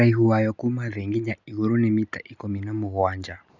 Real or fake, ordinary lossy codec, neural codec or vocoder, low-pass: real; none; none; 7.2 kHz